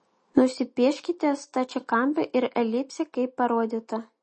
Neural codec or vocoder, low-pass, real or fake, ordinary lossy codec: none; 10.8 kHz; real; MP3, 32 kbps